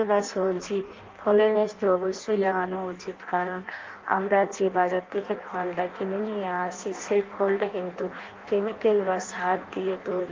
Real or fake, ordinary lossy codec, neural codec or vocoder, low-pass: fake; Opus, 32 kbps; codec, 16 kHz in and 24 kHz out, 0.6 kbps, FireRedTTS-2 codec; 7.2 kHz